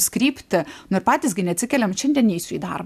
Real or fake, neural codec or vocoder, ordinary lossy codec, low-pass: real; none; AAC, 96 kbps; 14.4 kHz